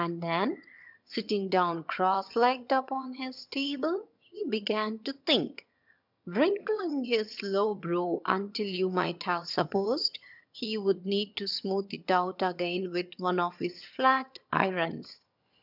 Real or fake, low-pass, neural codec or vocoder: fake; 5.4 kHz; vocoder, 22.05 kHz, 80 mel bands, HiFi-GAN